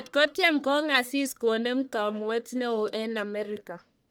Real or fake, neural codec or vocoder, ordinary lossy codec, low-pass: fake; codec, 44.1 kHz, 1.7 kbps, Pupu-Codec; none; none